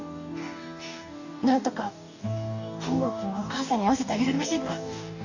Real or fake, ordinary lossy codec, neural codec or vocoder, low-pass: fake; none; codec, 44.1 kHz, 2.6 kbps, DAC; 7.2 kHz